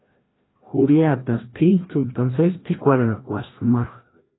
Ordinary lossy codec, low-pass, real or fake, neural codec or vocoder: AAC, 16 kbps; 7.2 kHz; fake; codec, 16 kHz, 1 kbps, FreqCodec, larger model